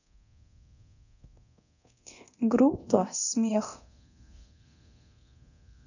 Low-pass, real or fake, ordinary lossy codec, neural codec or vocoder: 7.2 kHz; fake; none; codec, 24 kHz, 0.9 kbps, DualCodec